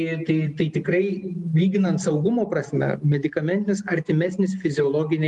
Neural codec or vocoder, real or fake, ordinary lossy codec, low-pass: none; real; Opus, 32 kbps; 10.8 kHz